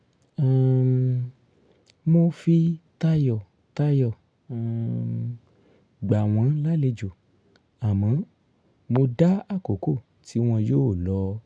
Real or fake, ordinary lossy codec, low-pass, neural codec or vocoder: real; none; 9.9 kHz; none